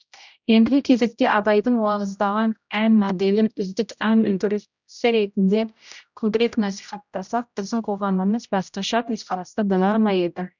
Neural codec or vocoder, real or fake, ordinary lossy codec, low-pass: codec, 16 kHz, 0.5 kbps, X-Codec, HuBERT features, trained on general audio; fake; none; 7.2 kHz